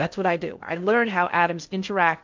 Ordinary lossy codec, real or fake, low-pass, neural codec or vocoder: MP3, 64 kbps; fake; 7.2 kHz; codec, 16 kHz in and 24 kHz out, 0.8 kbps, FocalCodec, streaming, 65536 codes